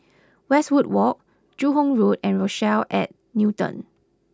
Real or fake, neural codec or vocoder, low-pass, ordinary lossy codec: real; none; none; none